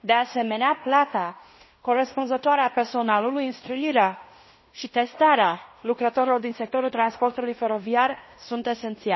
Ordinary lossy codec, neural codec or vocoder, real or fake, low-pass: MP3, 24 kbps; codec, 16 kHz in and 24 kHz out, 0.9 kbps, LongCat-Audio-Codec, fine tuned four codebook decoder; fake; 7.2 kHz